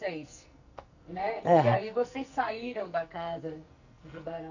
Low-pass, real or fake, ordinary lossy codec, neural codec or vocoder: 7.2 kHz; fake; none; codec, 44.1 kHz, 2.6 kbps, SNAC